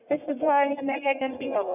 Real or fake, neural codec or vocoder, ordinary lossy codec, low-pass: fake; codec, 44.1 kHz, 1.7 kbps, Pupu-Codec; none; 3.6 kHz